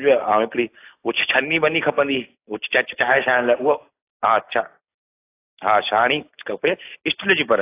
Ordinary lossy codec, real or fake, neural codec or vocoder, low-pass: AAC, 24 kbps; real; none; 3.6 kHz